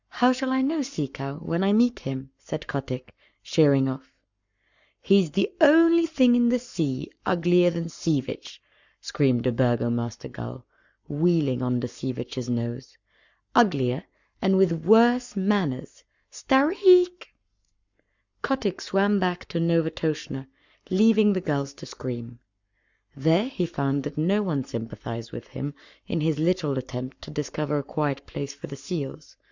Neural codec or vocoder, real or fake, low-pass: codec, 44.1 kHz, 7.8 kbps, Pupu-Codec; fake; 7.2 kHz